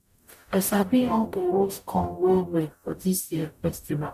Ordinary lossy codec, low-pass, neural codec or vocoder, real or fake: none; 14.4 kHz; codec, 44.1 kHz, 0.9 kbps, DAC; fake